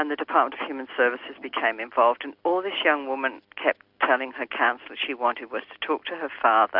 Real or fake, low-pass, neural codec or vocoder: real; 5.4 kHz; none